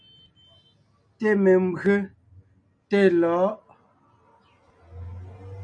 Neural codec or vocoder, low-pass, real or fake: none; 9.9 kHz; real